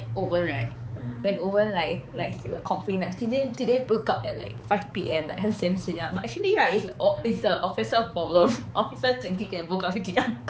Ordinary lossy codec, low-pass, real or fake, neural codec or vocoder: none; none; fake; codec, 16 kHz, 4 kbps, X-Codec, HuBERT features, trained on balanced general audio